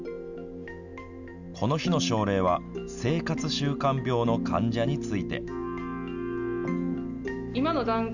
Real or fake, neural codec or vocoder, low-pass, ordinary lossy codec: real; none; 7.2 kHz; none